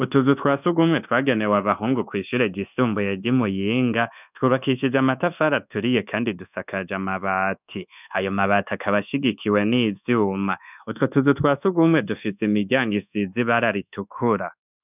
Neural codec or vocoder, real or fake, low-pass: codec, 24 kHz, 1.2 kbps, DualCodec; fake; 3.6 kHz